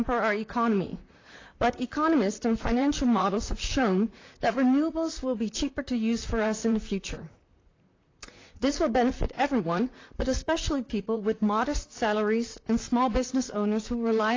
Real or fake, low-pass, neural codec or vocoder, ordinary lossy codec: fake; 7.2 kHz; vocoder, 44.1 kHz, 128 mel bands, Pupu-Vocoder; AAC, 32 kbps